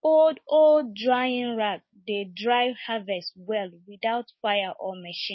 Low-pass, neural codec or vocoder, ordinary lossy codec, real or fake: 7.2 kHz; codec, 16 kHz, 6 kbps, DAC; MP3, 24 kbps; fake